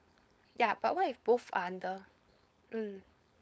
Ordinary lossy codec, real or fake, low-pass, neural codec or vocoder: none; fake; none; codec, 16 kHz, 4.8 kbps, FACodec